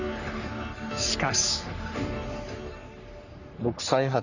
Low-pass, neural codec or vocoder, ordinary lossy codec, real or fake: 7.2 kHz; codec, 44.1 kHz, 3.4 kbps, Pupu-Codec; none; fake